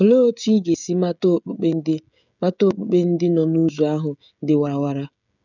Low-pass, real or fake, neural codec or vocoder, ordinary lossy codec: 7.2 kHz; fake; codec, 16 kHz, 16 kbps, FreqCodec, smaller model; none